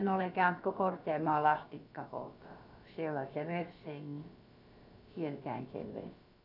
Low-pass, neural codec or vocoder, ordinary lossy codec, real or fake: 5.4 kHz; codec, 16 kHz, about 1 kbps, DyCAST, with the encoder's durations; none; fake